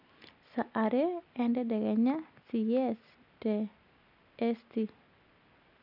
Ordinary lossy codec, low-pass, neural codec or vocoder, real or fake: none; 5.4 kHz; none; real